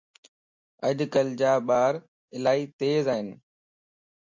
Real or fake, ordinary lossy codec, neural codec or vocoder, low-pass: real; MP3, 48 kbps; none; 7.2 kHz